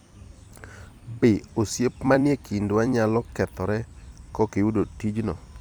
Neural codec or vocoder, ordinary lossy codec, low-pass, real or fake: vocoder, 44.1 kHz, 128 mel bands every 256 samples, BigVGAN v2; none; none; fake